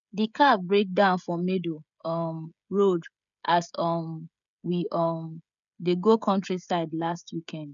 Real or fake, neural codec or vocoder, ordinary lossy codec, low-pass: fake; codec, 16 kHz, 16 kbps, FreqCodec, smaller model; none; 7.2 kHz